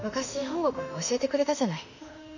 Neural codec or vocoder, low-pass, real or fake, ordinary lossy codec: autoencoder, 48 kHz, 32 numbers a frame, DAC-VAE, trained on Japanese speech; 7.2 kHz; fake; none